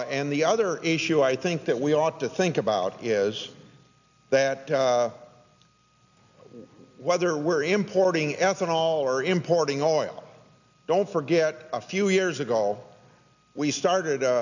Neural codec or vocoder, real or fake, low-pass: none; real; 7.2 kHz